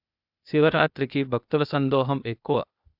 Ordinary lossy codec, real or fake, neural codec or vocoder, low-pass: none; fake; codec, 16 kHz, 0.8 kbps, ZipCodec; 5.4 kHz